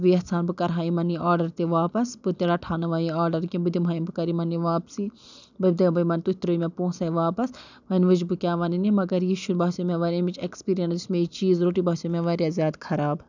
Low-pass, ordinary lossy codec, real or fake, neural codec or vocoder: 7.2 kHz; none; real; none